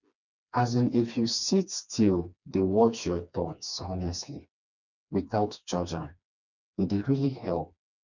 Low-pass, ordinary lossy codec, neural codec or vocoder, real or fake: 7.2 kHz; none; codec, 16 kHz, 2 kbps, FreqCodec, smaller model; fake